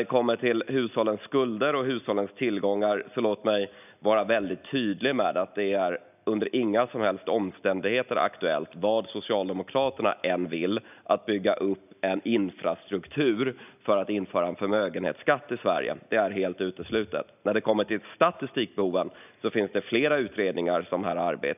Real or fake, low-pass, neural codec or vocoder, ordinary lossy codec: real; 3.6 kHz; none; none